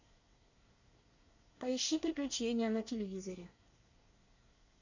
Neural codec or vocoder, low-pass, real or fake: codec, 24 kHz, 1 kbps, SNAC; 7.2 kHz; fake